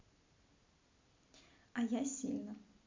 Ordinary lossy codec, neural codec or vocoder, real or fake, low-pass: MP3, 64 kbps; none; real; 7.2 kHz